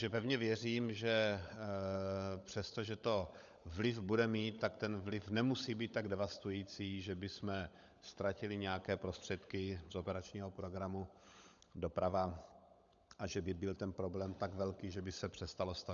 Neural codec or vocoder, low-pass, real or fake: codec, 16 kHz, 16 kbps, FunCodec, trained on LibriTTS, 50 frames a second; 7.2 kHz; fake